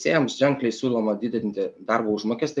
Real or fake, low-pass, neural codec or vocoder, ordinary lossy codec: real; 10.8 kHz; none; MP3, 96 kbps